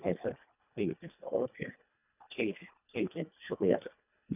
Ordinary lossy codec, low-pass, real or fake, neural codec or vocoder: none; 3.6 kHz; fake; codec, 24 kHz, 1.5 kbps, HILCodec